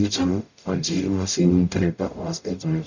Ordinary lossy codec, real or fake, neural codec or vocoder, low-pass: none; fake; codec, 44.1 kHz, 0.9 kbps, DAC; 7.2 kHz